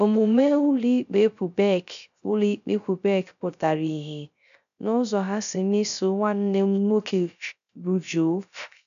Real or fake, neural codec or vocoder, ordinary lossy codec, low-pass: fake; codec, 16 kHz, 0.3 kbps, FocalCodec; none; 7.2 kHz